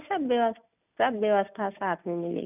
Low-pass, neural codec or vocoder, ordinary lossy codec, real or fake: 3.6 kHz; none; none; real